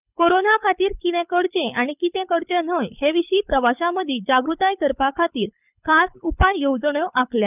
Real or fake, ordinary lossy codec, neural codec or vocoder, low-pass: fake; none; codec, 24 kHz, 6 kbps, HILCodec; 3.6 kHz